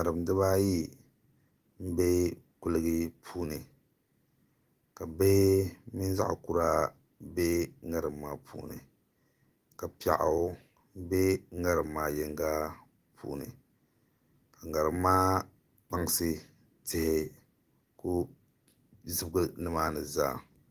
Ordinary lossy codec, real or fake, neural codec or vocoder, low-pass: Opus, 24 kbps; real; none; 14.4 kHz